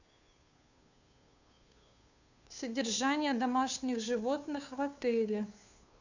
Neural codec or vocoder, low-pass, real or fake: codec, 16 kHz, 2 kbps, FunCodec, trained on Chinese and English, 25 frames a second; 7.2 kHz; fake